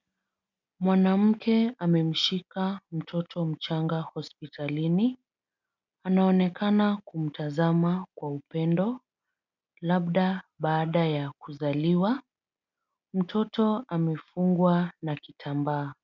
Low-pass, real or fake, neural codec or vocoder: 7.2 kHz; real; none